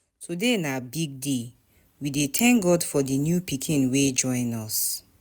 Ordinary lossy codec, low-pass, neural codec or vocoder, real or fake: none; none; none; real